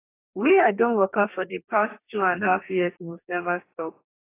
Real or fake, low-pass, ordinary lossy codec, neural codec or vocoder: fake; 3.6 kHz; AAC, 24 kbps; codec, 44.1 kHz, 2.6 kbps, DAC